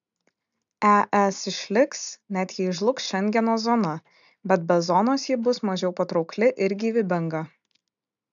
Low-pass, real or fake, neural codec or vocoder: 7.2 kHz; real; none